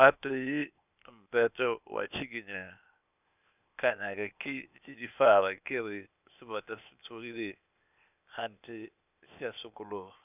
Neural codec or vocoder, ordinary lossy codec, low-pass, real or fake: codec, 16 kHz, 0.8 kbps, ZipCodec; none; 3.6 kHz; fake